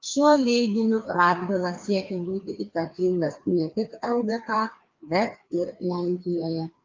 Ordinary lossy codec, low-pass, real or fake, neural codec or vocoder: Opus, 24 kbps; 7.2 kHz; fake; codec, 16 kHz, 2 kbps, FreqCodec, larger model